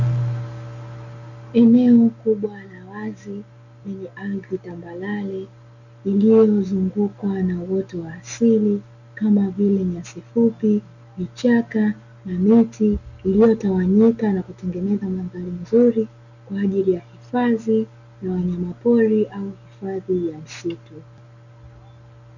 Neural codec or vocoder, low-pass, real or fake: none; 7.2 kHz; real